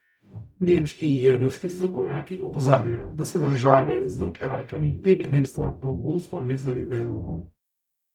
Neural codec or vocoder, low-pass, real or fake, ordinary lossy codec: codec, 44.1 kHz, 0.9 kbps, DAC; 19.8 kHz; fake; none